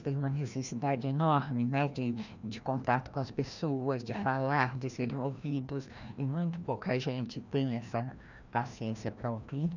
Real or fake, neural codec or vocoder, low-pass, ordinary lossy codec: fake; codec, 16 kHz, 1 kbps, FreqCodec, larger model; 7.2 kHz; none